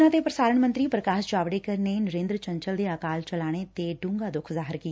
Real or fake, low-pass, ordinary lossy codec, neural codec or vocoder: real; none; none; none